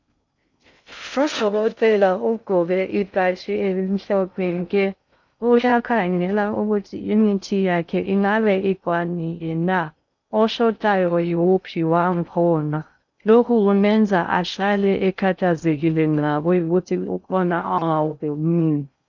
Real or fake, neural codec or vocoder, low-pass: fake; codec, 16 kHz in and 24 kHz out, 0.6 kbps, FocalCodec, streaming, 2048 codes; 7.2 kHz